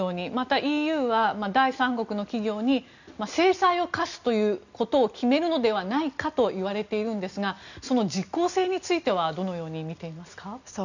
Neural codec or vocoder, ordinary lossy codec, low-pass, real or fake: none; none; 7.2 kHz; real